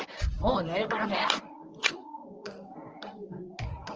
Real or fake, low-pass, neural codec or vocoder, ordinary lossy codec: fake; 7.2 kHz; codec, 24 kHz, 0.9 kbps, WavTokenizer, medium speech release version 1; Opus, 24 kbps